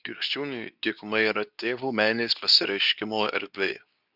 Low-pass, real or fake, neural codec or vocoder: 5.4 kHz; fake; codec, 24 kHz, 0.9 kbps, WavTokenizer, medium speech release version 2